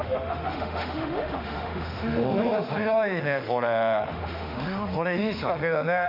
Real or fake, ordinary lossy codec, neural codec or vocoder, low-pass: fake; none; autoencoder, 48 kHz, 32 numbers a frame, DAC-VAE, trained on Japanese speech; 5.4 kHz